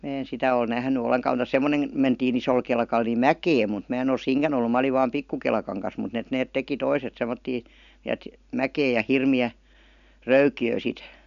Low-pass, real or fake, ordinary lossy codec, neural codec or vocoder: 7.2 kHz; real; none; none